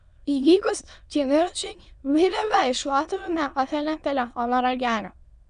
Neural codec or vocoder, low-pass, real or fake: autoencoder, 22.05 kHz, a latent of 192 numbers a frame, VITS, trained on many speakers; 9.9 kHz; fake